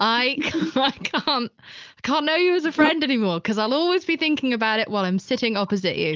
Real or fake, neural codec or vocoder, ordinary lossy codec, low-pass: real; none; Opus, 24 kbps; 7.2 kHz